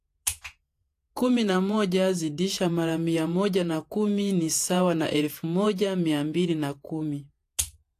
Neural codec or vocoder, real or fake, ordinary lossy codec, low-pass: vocoder, 48 kHz, 128 mel bands, Vocos; fake; AAC, 64 kbps; 14.4 kHz